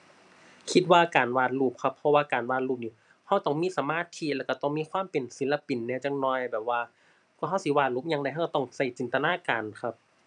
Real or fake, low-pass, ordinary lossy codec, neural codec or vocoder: fake; 10.8 kHz; none; vocoder, 44.1 kHz, 128 mel bands every 256 samples, BigVGAN v2